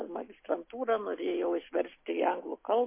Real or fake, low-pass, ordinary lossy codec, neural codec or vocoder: real; 3.6 kHz; MP3, 24 kbps; none